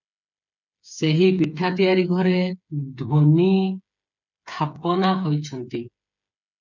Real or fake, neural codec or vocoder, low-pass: fake; codec, 16 kHz, 8 kbps, FreqCodec, smaller model; 7.2 kHz